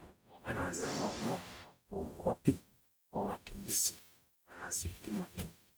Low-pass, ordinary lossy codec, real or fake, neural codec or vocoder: none; none; fake; codec, 44.1 kHz, 0.9 kbps, DAC